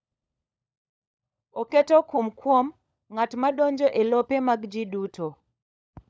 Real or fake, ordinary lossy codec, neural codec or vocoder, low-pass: fake; none; codec, 16 kHz, 16 kbps, FunCodec, trained on LibriTTS, 50 frames a second; none